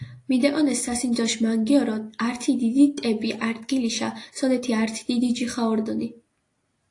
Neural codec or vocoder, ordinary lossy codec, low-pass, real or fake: none; AAC, 48 kbps; 10.8 kHz; real